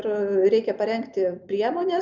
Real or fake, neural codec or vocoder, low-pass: real; none; 7.2 kHz